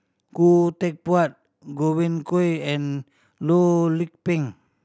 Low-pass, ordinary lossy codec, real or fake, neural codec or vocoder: none; none; real; none